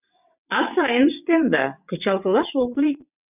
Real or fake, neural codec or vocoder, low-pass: fake; vocoder, 22.05 kHz, 80 mel bands, WaveNeXt; 3.6 kHz